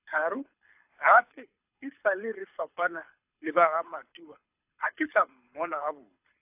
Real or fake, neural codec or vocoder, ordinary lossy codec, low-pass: fake; codec, 24 kHz, 6 kbps, HILCodec; AAC, 32 kbps; 3.6 kHz